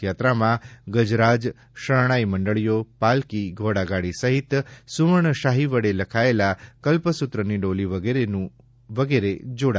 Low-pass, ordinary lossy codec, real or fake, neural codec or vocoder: none; none; real; none